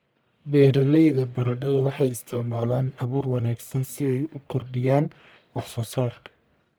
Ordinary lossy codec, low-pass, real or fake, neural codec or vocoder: none; none; fake; codec, 44.1 kHz, 1.7 kbps, Pupu-Codec